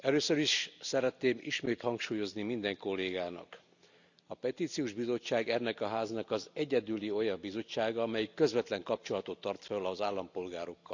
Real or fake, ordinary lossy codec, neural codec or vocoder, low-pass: real; none; none; 7.2 kHz